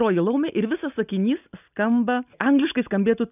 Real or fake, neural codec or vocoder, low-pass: real; none; 3.6 kHz